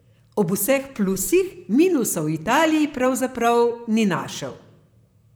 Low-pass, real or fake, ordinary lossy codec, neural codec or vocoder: none; fake; none; vocoder, 44.1 kHz, 128 mel bands, Pupu-Vocoder